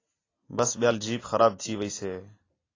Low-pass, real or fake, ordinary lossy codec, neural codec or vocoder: 7.2 kHz; real; AAC, 32 kbps; none